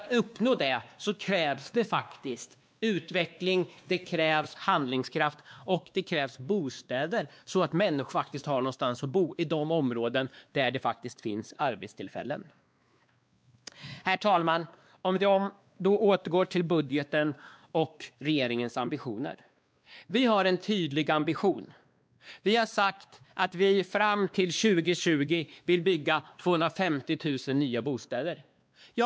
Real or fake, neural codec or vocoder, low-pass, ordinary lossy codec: fake; codec, 16 kHz, 2 kbps, X-Codec, WavLM features, trained on Multilingual LibriSpeech; none; none